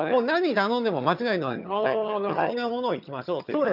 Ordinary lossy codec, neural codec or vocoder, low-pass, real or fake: none; vocoder, 22.05 kHz, 80 mel bands, HiFi-GAN; 5.4 kHz; fake